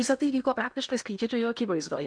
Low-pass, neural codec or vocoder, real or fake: 9.9 kHz; codec, 16 kHz in and 24 kHz out, 0.8 kbps, FocalCodec, streaming, 65536 codes; fake